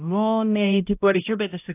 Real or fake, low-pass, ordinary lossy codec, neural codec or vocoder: fake; 3.6 kHz; AAC, 32 kbps; codec, 16 kHz, 0.5 kbps, X-Codec, HuBERT features, trained on balanced general audio